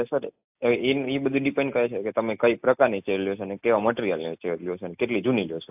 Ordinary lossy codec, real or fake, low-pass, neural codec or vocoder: AAC, 32 kbps; real; 3.6 kHz; none